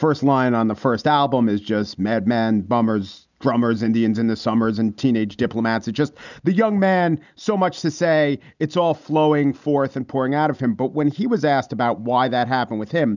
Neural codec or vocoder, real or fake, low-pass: none; real; 7.2 kHz